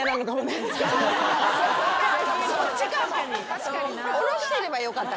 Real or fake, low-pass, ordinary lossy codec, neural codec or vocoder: real; none; none; none